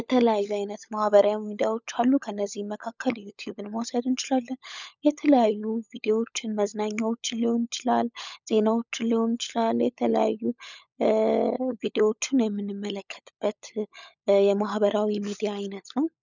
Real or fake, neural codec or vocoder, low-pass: fake; codec, 16 kHz, 16 kbps, FunCodec, trained on LibriTTS, 50 frames a second; 7.2 kHz